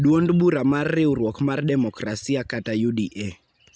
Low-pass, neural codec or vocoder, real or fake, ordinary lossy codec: none; none; real; none